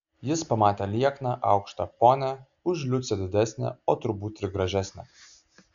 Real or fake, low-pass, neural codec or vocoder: real; 7.2 kHz; none